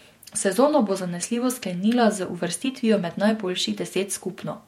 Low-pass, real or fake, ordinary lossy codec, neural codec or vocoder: 19.8 kHz; fake; MP3, 64 kbps; vocoder, 48 kHz, 128 mel bands, Vocos